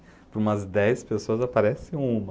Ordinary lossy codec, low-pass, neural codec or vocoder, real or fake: none; none; none; real